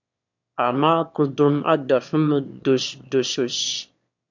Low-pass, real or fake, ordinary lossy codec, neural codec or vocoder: 7.2 kHz; fake; MP3, 64 kbps; autoencoder, 22.05 kHz, a latent of 192 numbers a frame, VITS, trained on one speaker